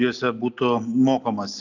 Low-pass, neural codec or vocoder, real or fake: 7.2 kHz; none; real